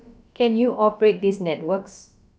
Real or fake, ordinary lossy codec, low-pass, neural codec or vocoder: fake; none; none; codec, 16 kHz, about 1 kbps, DyCAST, with the encoder's durations